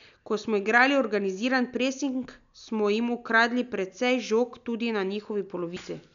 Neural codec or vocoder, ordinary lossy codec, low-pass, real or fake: none; none; 7.2 kHz; real